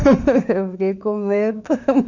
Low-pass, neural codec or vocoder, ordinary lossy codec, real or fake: 7.2 kHz; autoencoder, 48 kHz, 32 numbers a frame, DAC-VAE, trained on Japanese speech; none; fake